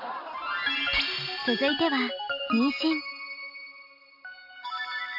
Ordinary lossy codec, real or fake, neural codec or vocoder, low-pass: none; real; none; 5.4 kHz